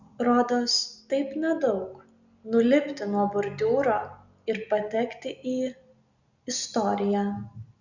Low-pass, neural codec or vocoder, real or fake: 7.2 kHz; none; real